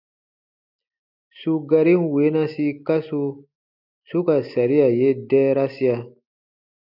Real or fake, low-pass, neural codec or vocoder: real; 5.4 kHz; none